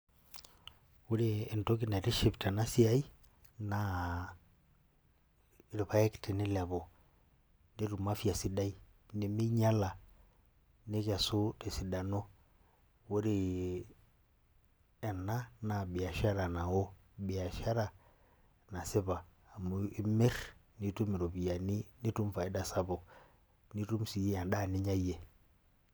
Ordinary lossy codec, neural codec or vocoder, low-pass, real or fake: none; none; none; real